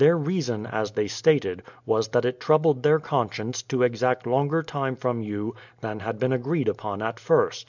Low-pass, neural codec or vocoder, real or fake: 7.2 kHz; none; real